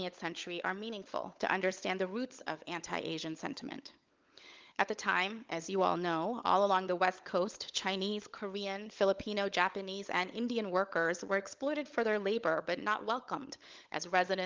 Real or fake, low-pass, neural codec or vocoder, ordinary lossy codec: fake; 7.2 kHz; codec, 16 kHz, 16 kbps, FunCodec, trained on LibriTTS, 50 frames a second; Opus, 32 kbps